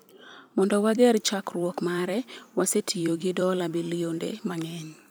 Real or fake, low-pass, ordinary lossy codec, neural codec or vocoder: fake; none; none; vocoder, 44.1 kHz, 128 mel bands every 256 samples, BigVGAN v2